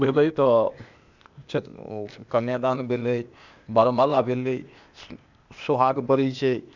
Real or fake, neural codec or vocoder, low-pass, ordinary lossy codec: fake; codec, 16 kHz, 0.8 kbps, ZipCodec; 7.2 kHz; none